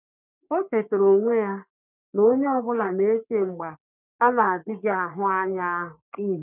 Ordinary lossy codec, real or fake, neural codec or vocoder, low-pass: none; fake; codec, 44.1 kHz, 3.4 kbps, Pupu-Codec; 3.6 kHz